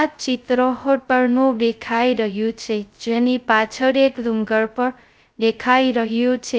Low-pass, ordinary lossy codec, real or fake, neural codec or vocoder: none; none; fake; codec, 16 kHz, 0.2 kbps, FocalCodec